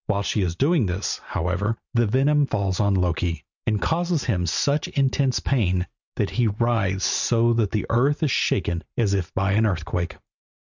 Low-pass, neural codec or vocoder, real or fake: 7.2 kHz; none; real